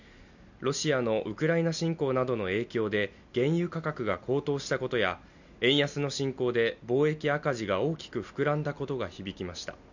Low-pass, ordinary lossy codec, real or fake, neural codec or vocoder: 7.2 kHz; none; real; none